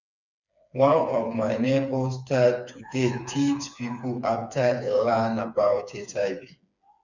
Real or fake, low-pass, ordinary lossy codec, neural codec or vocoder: fake; 7.2 kHz; none; codec, 16 kHz, 4 kbps, FreqCodec, smaller model